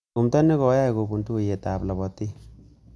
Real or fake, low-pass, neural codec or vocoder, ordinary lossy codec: real; none; none; none